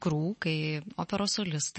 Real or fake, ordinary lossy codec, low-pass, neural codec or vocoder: real; MP3, 32 kbps; 10.8 kHz; none